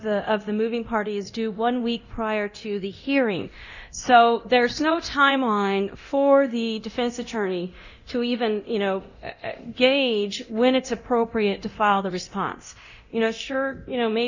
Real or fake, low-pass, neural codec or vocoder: fake; 7.2 kHz; codec, 24 kHz, 0.9 kbps, DualCodec